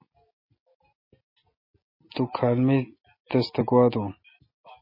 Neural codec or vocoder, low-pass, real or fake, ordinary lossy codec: none; 5.4 kHz; real; MP3, 24 kbps